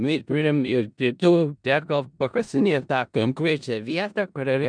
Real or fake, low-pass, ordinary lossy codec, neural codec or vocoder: fake; 9.9 kHz; MP3, 96 kbps; codec, 16 kHz in and 24 kHz out, 0.4 kbps, LongCat-Audio-Codec, four codebook decoder